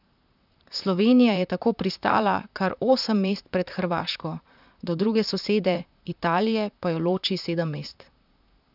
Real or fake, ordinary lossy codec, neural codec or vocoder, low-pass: fake; none; vocoder, 44.1 kHz, 128 mel bands, Pupu-Vocoder; 5.4 kHz